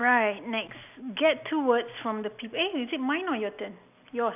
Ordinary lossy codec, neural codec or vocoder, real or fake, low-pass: none; none; real; 3.6 kHz